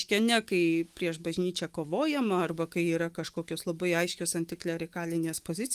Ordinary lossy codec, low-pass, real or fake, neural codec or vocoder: Opus, 24 kbps; 14.4 kHz; fake; autoencoder, 48 kHz, 128 numbers a frame, DAC-VAE, trained on Japanese speech